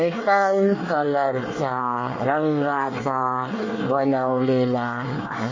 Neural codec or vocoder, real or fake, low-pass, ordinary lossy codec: codec, 24 kHz, 1 kbps, SNAC; fake; 7.2 kHz; MP3, 32 kbps